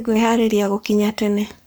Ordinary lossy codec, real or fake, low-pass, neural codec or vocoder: none; fake; none; codec, 44.1 kHz, 7.8 kbps, Pupu-Codec